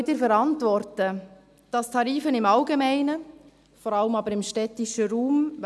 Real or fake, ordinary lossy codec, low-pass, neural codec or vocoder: real; none; none; none